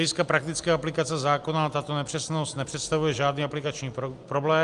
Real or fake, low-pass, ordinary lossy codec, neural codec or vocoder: real; 10.8 kHz; Opus, 32 kbps; none